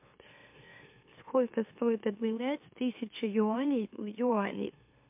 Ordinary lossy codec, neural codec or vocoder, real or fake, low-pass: MP3, 32 kbps; autoencoder, 44.1 kHz, a latent of 192 numbers a frame, MeloTTS; fake; 3.6 kHz